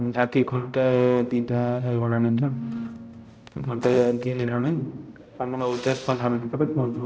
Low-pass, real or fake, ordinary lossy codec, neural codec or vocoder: none; fake; none; codec, 16 kHz, 0.5 kbps, X-Codec, HuBERT features, trained on balanced general audio